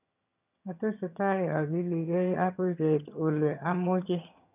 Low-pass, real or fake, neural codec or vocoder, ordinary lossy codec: 3.6 kHz; fake; vocoder, 22.05 kHz, 80 mel bands, HiFi-GAN; none